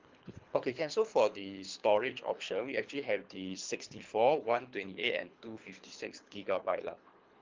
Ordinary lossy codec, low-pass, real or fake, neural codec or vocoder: Opus, 24 kbps; 7.2 kHz; fake; codec, 24 kHz, 3 kbps, HILCodec